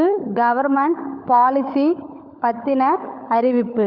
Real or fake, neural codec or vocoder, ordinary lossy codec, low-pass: fake; codec, 16 kHz, 16 kbps, FunCodec, trained on LibriTTS, 50 frames a second; Opus, 64 kbps; 5.4 kHz